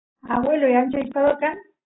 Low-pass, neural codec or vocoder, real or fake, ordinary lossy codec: 7.2 kHz; none; real; AAC, 16 kbps